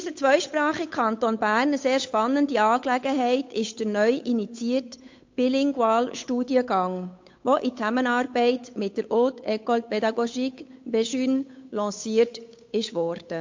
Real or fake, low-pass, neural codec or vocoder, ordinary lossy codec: fake; 7.2 kHz; codec, 16 kHz, 8 kbps, FunCodec, trained on Chinese and English, 25 frames a second; MP3, 48 kbps